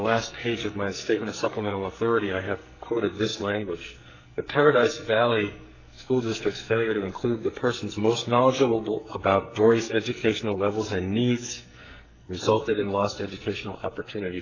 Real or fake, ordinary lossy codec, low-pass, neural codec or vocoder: fake; AAC, 48 kbps; 7.2 kHz; codec, 44.1 kHz, 2.6 kbps, SNAC